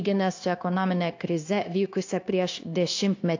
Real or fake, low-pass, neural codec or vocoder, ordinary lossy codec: fake; 7.2 kHz; codec, 16 kHz, 0.9 kbps, LongCat-Audio-Codec; AAC, 48 kbps